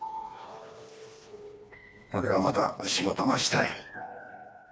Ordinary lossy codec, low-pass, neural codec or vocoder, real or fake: none; none; codec, 16 kHz, 2 kbps, FreqCodec, smaller model; fake